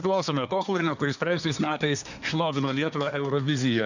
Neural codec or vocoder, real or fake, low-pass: codec, 24 kHz, 1 kbps, SNAC; fake; 7.2 kHz